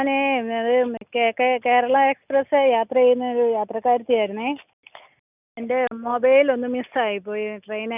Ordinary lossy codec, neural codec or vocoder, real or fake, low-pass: none; none; real; 3.6 kHz